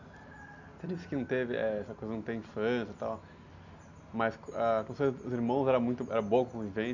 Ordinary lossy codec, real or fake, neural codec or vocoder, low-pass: none; real; none; 7.2 kHz